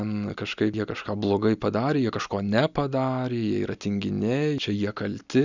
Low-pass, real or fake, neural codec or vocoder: 7.2 kHz; real; none